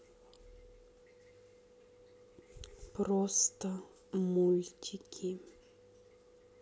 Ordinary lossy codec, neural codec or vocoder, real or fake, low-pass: none; none; real; none